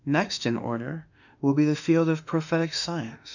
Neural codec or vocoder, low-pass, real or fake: autoencoder, 48 kHz, 32 numbers a frame, DAC-VAE, trained on Japanese speech; 7.2 kHz; fake